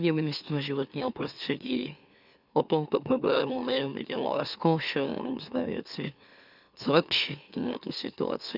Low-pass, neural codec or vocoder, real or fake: 5.4 kHz; autoencoder, 44.1 kHz, a latent of 192 numbers a frame, MeloTTS; fake